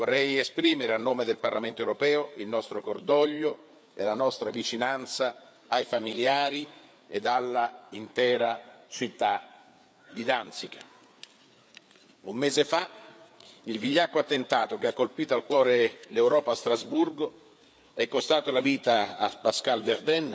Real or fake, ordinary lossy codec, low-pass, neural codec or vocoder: fake; none; none; codec, 16 kHz, 4 kbps, FreqCodec, larger model